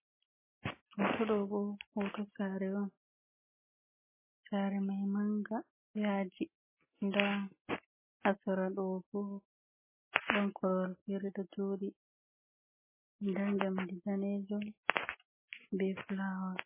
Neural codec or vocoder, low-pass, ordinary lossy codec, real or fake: none; 3.6 kHz; MP3, 16 kbps; real